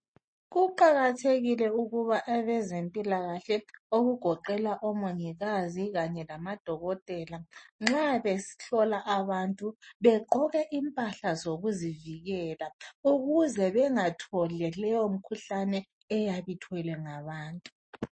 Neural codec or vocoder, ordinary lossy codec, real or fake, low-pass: none; MP3, 32 kbps; real; 9.9 kHz